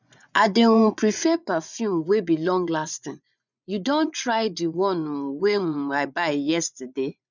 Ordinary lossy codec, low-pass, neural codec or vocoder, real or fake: none; 7.2 kHz; vocoder, 24 kHz, 100 mel bands, Vocos; fake